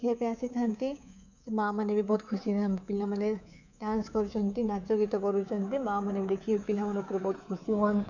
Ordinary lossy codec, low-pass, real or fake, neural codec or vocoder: none; 7.2 kHz; fake; codec, 24 kHz, 3.1 kbps, DualCodec